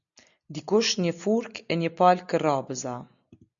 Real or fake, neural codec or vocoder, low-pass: real; none; 7.2 kHz